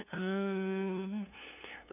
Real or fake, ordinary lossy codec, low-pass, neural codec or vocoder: fake; none; 3.6 kHz; codec, 16 kHz, 2 kbps, FunCodec, trained on LibriTTS, 25 frames a second